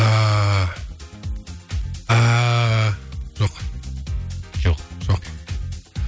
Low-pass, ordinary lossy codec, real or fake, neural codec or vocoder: none; none; real; none